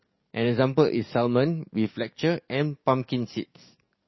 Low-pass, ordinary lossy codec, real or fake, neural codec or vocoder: 7.2 kHz; MP3, 24 kbps; fake; codec, 44.1 kHz, 7.8 kbps, DAC